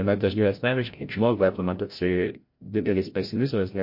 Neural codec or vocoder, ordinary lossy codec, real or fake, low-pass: codec, 16 kHz, 0.5 kbps, FreqCodec, larger model; MP3, 32 kbps; fake; 5.4 kHz